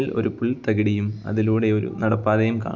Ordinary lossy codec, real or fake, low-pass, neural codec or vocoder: none; real; 7.2 kHz; none